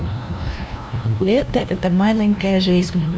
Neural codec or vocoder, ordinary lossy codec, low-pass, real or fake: codec, 16 kHz, 0.5 kbps, FunCodec, trained on LibriTTS, 25 frames a second; none; none; fake